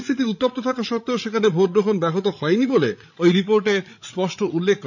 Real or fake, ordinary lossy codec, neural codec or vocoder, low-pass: fake; none; codec, 16 kHz, 16 kbps, FreqCodec, larger model; 7.2 kHz